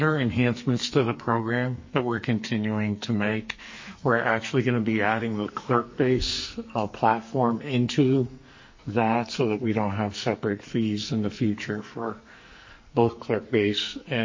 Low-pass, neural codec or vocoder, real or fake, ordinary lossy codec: 7.2 kHz; codec, 44.1 kHz, 2.6 kbps, SNAC; fake; MP3, 32 kbps